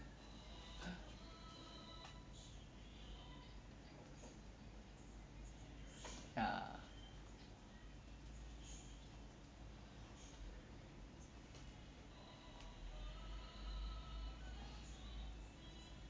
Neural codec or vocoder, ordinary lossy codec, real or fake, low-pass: none; none; real; none